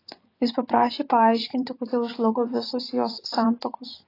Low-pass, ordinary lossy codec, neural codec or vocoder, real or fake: 5.4 kHz; AAC, 24 kbps; vocoder, 24 kHz, 100 mel bands, Vocos; fake